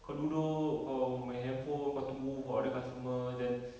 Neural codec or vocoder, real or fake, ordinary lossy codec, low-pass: none; real; none; none